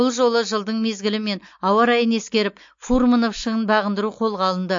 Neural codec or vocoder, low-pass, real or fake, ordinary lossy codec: none; 7.2 kHz; real; MP3, 48 kbps